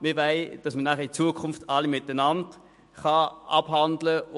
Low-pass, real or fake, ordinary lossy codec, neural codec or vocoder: 14.4 kHz; fake; MP3, 48 kbps; autoencoder, 48 kHz, 128 numbers a frame, DAC-VAE, trained on Japanese speech